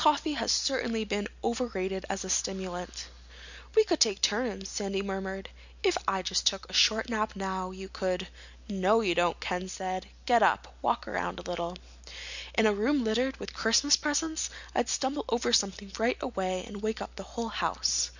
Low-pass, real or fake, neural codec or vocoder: 7.2 kHz; real; none